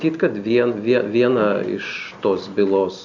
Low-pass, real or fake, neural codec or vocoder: 7.2 kHz; real; none